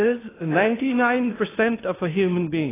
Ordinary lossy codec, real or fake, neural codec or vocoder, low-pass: AAC, 16 kbps; fake; codec, 16 kHz in and 24 kHz out, 0.6 kbps, FocalCodec, streaming, 2048 codes; 3.6 kHz